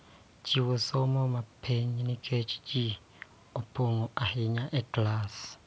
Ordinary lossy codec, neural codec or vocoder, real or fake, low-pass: none; none; real; none